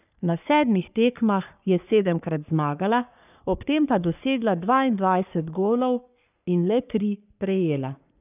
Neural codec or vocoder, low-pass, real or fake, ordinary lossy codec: codec, 44.1 kHz, 3.4 kbps, Pupu-Codec; 3.6 kHz; fake; none